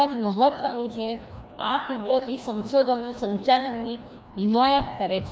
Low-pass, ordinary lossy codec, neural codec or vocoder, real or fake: none; none; codec, 16 kHz, 1 kbps, FreqCodec, larger model; fake